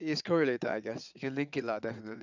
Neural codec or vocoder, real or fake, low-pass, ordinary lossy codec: vocoder, 22.05 kHz, 80 mel bands, WaveNeXt; fake; 7.2 kHz; none